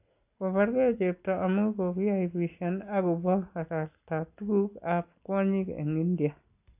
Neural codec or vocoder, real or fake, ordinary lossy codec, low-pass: none; real; AAC, 32 kbps; 3.6 kHz